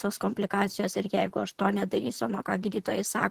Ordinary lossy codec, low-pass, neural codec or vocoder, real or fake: Opus, 16 kbps; 14.4 kHz; vocoder, 44.1 kHz, 128 mel bands, Pupu-Vocoder; fake